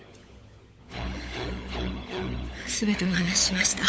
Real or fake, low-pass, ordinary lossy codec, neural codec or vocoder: fake; none; none; codec, 16 kHz, 16 kbps, FunCodec, trained on LibriTTS, 50 frames a second